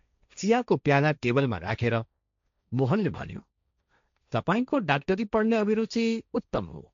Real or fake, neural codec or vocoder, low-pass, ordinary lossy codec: fake; codec, 16 kHz, 1.1 kbps, Voila-Tokenizer; 7.2 kHz; MP3, 64 kbps